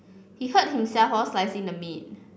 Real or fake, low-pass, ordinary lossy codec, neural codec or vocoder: real; none; none; none